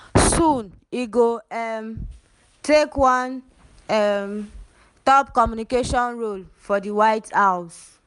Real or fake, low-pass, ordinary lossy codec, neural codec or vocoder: real; 10.8 kHz; none; none